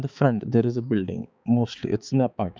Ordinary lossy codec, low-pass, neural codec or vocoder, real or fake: none; none; codec, 16 kHz, 4 kbps, X-Codec, HuBERT features, trained on balanced general audio; fake